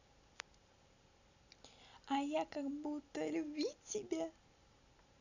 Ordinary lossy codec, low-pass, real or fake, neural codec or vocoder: none; 7.2 kHz; real; none